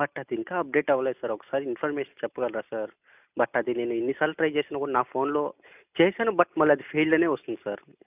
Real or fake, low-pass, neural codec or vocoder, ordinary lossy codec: real; 3.6 kHz; none; none